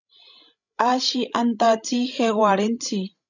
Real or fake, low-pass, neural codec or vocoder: fake; 7.2 kHz; codec, 16 kHz, 16 kbps, FreqCodec, larger model